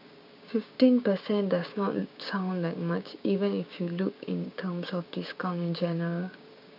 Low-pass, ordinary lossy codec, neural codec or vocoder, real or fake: 5.4 kHz; none; codec, 16 kHz, 6 kbps, DAC; fake